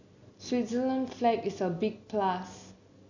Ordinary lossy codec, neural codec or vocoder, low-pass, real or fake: none; none; 7.2 kHz; real